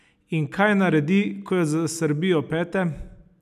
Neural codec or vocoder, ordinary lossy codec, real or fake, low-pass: none; none; real; 14.4 kHz